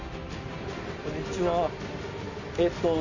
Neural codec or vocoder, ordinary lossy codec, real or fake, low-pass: none; none; real; 7.2 kHz